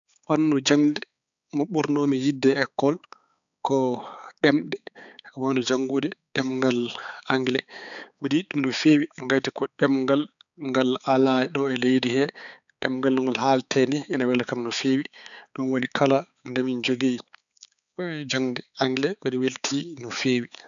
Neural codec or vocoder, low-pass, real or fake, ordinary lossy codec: codec, 16 kHz, 4 kbps, X-Codec, HuBERT features, trained on balanced general audio; 7.2 kHz; fake; none